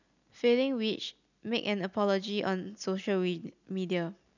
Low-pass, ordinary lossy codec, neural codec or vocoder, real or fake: 7.2 kHz; none; none; real